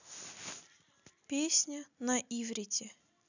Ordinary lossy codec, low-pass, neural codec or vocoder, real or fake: none; 7.2 kHz; none; real